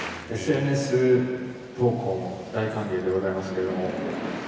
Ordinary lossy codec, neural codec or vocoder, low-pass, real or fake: none; none; none; real